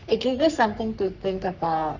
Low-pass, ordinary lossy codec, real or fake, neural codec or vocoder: 7.2 kHz; none; fake; codec, 44.1 kHz, 3.4 kbps, Pupu-Codec